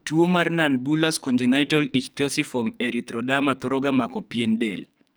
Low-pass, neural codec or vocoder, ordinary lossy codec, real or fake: none; codec, 44.1 kHz, 2.6 kbps, SNAC; none; fake